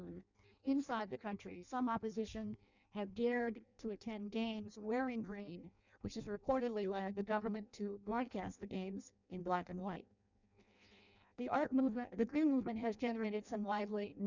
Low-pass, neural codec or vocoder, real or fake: 7.2 kHz; codec, 16 kHz in and 24 kHz out, 0.6 kbps, FireRedTTS-2 codec; fake